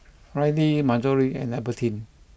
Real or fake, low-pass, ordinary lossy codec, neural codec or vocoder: real; none; none; none